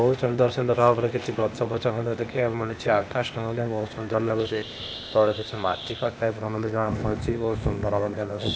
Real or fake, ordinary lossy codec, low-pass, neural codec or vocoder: fake; none; none; codec, 16 kHz, 0.8 kbps, ZipCodec